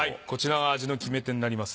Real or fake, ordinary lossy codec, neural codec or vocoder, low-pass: real; none; none; none